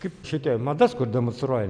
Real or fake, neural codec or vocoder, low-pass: real; none; 9.9 kHz